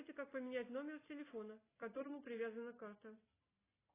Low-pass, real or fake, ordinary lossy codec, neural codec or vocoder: 3.6 kHz; real; AAC, 16 kbps; none